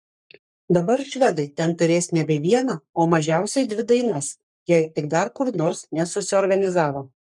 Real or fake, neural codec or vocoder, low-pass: fake; codec, 44.1 kHz, 3.4 kbps, Pupu-Codec; 10.8 kHz